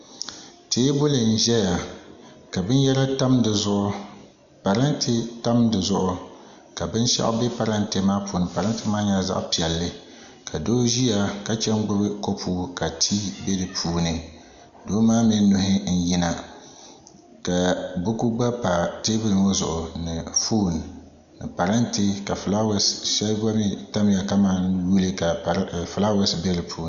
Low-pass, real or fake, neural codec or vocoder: 7.2 kHz; real; none